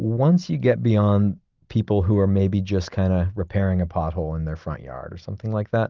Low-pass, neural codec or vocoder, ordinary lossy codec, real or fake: 7.2 kHz; none; Opus, 32 kbps; real